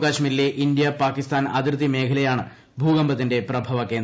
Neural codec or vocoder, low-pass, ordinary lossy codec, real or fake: none; none; none; real